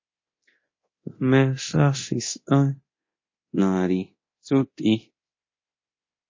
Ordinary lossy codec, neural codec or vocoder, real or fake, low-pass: MP3, 32 kbps; codec, 24 kHz, 0.9 kbps, DualCodec; fake; 7.2 kHz